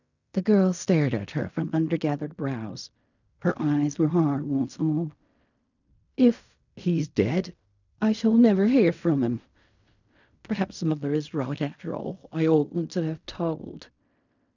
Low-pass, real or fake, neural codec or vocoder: 7.2 kHz; fake; codec, 16 kHz in and 24 kHz out, 0.4 kbps, LongCat-Audio-Codec, fine tuned four codebook decoder